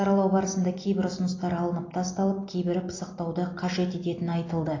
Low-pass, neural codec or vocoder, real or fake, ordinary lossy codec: 7.2 kHz; none; real; AAC, 32 kbps